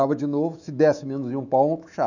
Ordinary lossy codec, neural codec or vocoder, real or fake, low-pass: none; autoencoder, 48 kHz, 128 numbers a frame, DAC-VAE, trained on Japanese speech; fake; 7.2 kHz